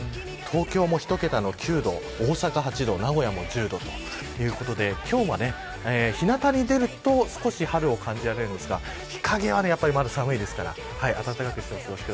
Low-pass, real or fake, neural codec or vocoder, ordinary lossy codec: none; real; none; none